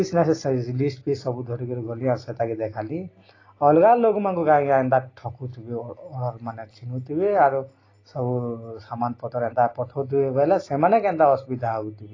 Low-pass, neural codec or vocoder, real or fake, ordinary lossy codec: 7.2 kHz; none; real; AAC, 32 kbps